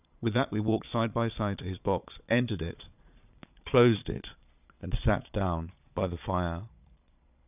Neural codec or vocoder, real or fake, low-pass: vocoder, 22.05 kHz, 80 mel bands, Vocos; fake; 3.6 kHz